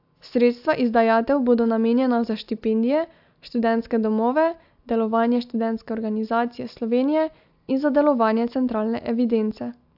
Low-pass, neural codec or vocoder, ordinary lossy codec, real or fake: 5.4 kHz; none; AAC, 48 kbps; real